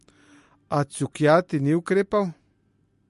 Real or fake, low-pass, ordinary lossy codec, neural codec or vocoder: real; 14.4 kHz; MP3, 48 kbps; none